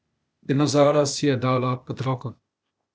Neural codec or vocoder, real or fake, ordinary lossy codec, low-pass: codec, 16 kHz, 0.8 kbps, ZipCodec; fake; none; none